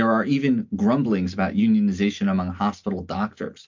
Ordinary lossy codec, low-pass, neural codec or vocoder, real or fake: MP3, 48 kbps; 7.2 kHz; none; real